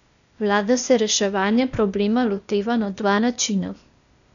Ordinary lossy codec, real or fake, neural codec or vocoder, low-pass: none; fake; codec, 16 kHz, 0.8 kbps, ZipCodec; 7.2 kHz